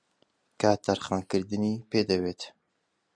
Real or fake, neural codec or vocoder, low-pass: real; none; 9.9 kHz